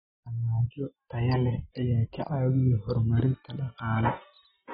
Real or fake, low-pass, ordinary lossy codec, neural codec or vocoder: real; 19.8 kHz; AAC, 16 kbps; none